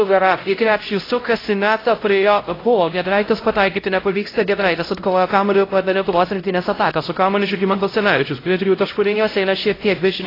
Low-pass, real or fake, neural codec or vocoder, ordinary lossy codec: 5.4 kHz; fake; codec, 16 kHz, 0.5 kbps, X-Codec, HuBERT features, trained on LibriSpeech; AAC, 24 kbps